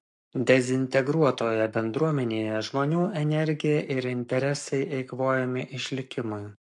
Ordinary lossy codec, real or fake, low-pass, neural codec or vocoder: MP3, 64 kbps; fake; 10.8 kHz; codec, 44.1 kHz, 7.8 kbps, Pupu-Codec